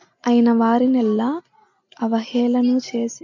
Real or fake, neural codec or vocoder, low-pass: real; none; 7.2 kHz